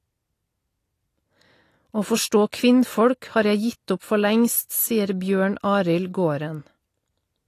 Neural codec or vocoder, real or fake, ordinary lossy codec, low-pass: none; real; AAC, 48 kbps; 14.4 kHz